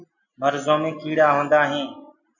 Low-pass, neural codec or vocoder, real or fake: 7.2 kHz; none; real